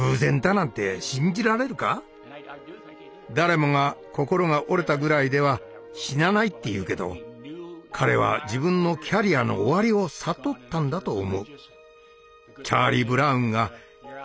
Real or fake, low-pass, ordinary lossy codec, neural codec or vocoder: real; none; none; none